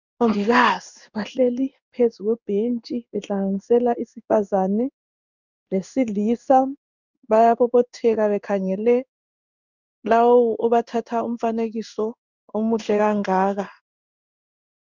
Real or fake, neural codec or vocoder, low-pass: fake; codec, 16 kHz in and 24 kHz out, 1 kbps, XY-Tokenizer; 7.2 kHz